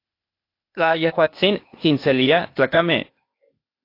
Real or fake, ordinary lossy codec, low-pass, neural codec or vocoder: fake; AAC, 32 kbps; 5.4 kHz; codec, 16 kHz, 0.8 kbps, ZipCodec